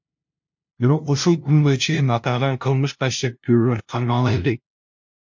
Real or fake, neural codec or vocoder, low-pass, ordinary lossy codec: fake; codec, 16 kHz, 0.5 kbps, FunCodec, trained on LibriTTS, 25 frames a second; 7.2 kHz; MP3, 48 kbps